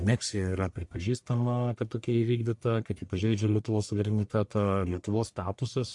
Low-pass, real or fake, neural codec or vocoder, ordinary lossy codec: 10.8 kHz; fake; codec, 44.1 kHz, 1.7 kbps, Pupu-Codec; MP3, 64 kbps